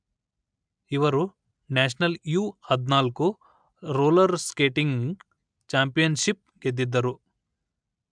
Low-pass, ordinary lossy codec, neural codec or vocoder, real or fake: 9.9 kHz; MP3, 96 kbps; none; real